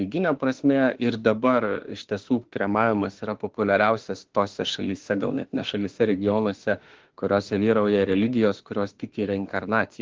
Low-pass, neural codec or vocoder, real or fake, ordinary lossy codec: 7.2 kHz; autoencoder, 48 kHz, 32 numbers a frame, DAC-VAE, trained on Japanese speech; fake; Opus, 16 kbps